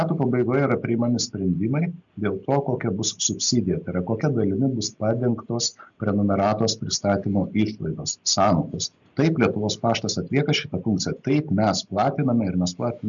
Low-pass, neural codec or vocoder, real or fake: 7.2 kHz; none; real